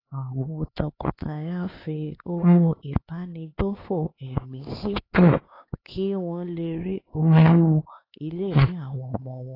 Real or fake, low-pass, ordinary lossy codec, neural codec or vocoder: fake; 5.4 kHz; AAC, 24 kbps; codec, 16 kHz, 4 kbps, X-Codec, HuBERT features, trained on LibriSpeech